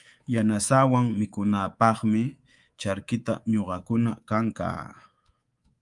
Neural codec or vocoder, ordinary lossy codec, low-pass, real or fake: codec, 24 kHz, 3.1 kbps, DualCodec; Opus, 32 kbps; 10.8 kHz; fake